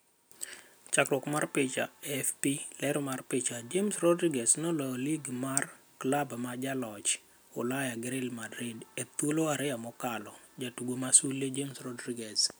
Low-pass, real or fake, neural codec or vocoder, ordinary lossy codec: none; real; none; none